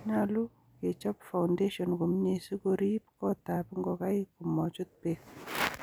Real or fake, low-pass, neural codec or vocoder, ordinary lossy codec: real; none; none; none